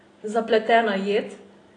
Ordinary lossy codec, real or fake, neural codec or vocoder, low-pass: AAC, 32 kbps; real; none; 9.9 kHz